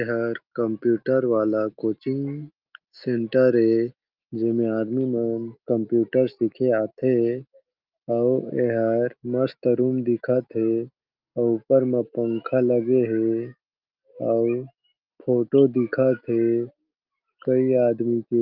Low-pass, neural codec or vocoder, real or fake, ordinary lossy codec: 5.4 kHz; none; real; Opus, 24 kbps